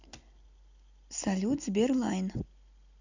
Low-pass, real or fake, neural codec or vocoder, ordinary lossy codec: 7.2 kHz; real; none; none